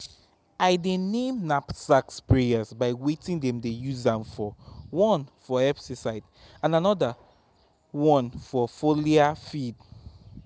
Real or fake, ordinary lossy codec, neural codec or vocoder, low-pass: real; none; none; none